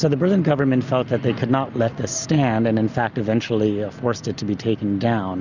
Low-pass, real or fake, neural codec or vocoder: 7.2 kHz; real; none